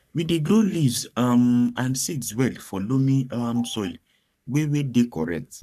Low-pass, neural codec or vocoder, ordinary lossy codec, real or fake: 14.4 kHz; codec, 44.1 kHz, 3.4 kbps, Pupu-Codec; none; fake